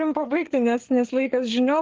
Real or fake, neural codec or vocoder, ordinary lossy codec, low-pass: fake; codec, 16 kHz, 4 kbps, FreqCodec, larger model; Opus, 16 kbps; 7.2 kHz